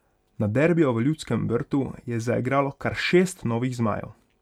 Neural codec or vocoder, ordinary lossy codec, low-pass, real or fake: none; none; 19.8 kHz; real